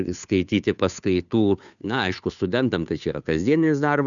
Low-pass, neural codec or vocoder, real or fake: 7.2 kHz; codec, 16 kHz, 2 kbps, FunCodec, trained on Chinese and English, 25 frames a second; fake